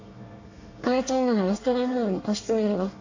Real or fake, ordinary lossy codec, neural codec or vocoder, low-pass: fake; AAC, 48 kbps; codec, 24 kHz, 1 kbps, SNAC; 7.2 kHz